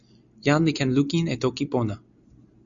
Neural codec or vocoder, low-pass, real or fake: none; 7.2 kHz; real